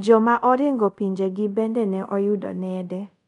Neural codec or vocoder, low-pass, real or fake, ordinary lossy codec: codec, 24 kHz, 0.5 kbps, DualCodec; 10.8 kHz; fake; none